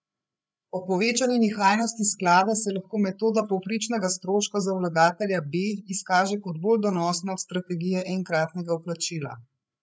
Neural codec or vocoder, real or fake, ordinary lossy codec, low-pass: codec, 16 kHz, 8 kbps, FreqCodec, larger model; fake; none; none